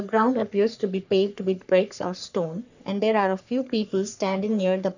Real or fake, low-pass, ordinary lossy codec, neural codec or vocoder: fake; 7.2 kHz; none; codec, 44.1 kHz, 3.4 kbps, Pupu-Codec